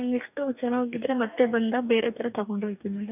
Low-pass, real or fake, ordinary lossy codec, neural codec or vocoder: 3.6 kHz; fake; none; codec, 44.1 kHz, 2.6 kbps, DAC